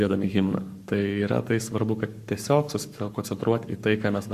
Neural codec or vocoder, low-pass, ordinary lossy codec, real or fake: codec, 44.1 kHz, 7.8 kbps, Pupu-Codec; 14.4 kHz; Opus, 64 kbps; fake